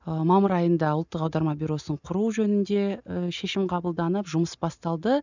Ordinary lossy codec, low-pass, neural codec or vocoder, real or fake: none; 7.2 kHz; none; real